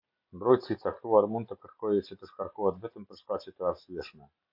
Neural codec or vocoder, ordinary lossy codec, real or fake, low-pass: none; MP3, 48 kbps; real; 5.4 kHz